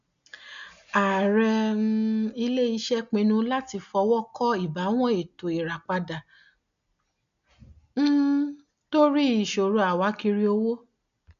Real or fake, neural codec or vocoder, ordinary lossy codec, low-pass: real; none; none; 7.2 kHz